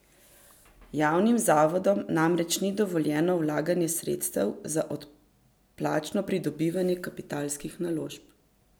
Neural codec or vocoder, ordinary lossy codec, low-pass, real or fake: none; none; none; real